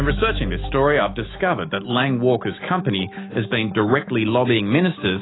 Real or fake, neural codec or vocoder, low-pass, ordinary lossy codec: fake; autoencoder, 48 kHz, 128 numbers a frame, DAC-VAE, trained on Japanese speech; 7.2 kHz; AAC, 16 kbps